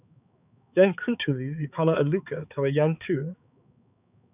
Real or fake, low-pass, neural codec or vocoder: fake; 3.6 kHz; codec, 16 kHz, 4 kbps, X-Codec, HuBERT features, trained on balanced general audio